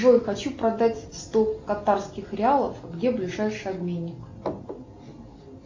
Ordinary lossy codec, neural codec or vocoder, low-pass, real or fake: MP3, 48 kbps; none; 7.2 kHz; real